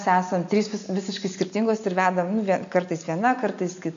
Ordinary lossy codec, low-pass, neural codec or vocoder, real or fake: AAC, 64 kbps; 7.2 kHz; none; real